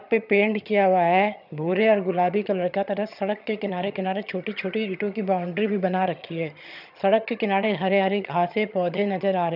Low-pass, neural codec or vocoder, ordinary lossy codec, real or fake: 5.4 kHz; vocoder, 22.05 kHz, 80 mel bands, HiFi-GAN; none; fake